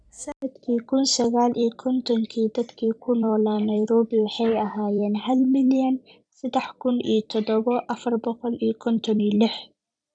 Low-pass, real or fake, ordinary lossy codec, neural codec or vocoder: 9.9 kHz; fake; none; vocoder, 44.1 kHz, 128 mel bands every 256 samples, BigVGAN v2